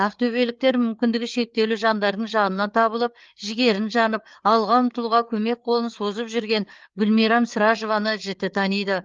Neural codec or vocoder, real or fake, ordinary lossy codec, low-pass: codec, 16 kHz, 4 kbps, FreqCodec, larger model; fake; Opus, 32 kbps; 7.2 kHz